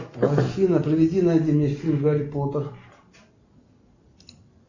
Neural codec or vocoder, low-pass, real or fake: none; 7.2 kHz; real